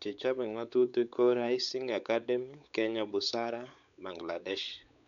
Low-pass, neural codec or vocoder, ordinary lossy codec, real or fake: 7.2 kHz; codec, 16 kHz, 4 kbps, X-Codec, WavLM features, trained on Multilingual LibriSpeech; none; fake